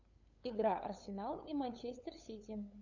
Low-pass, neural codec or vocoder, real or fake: 7.2 kHz; codec, 16 kHz, 8 kbps, FunCodec, trained on LibriTTS, 25 frames a second; fake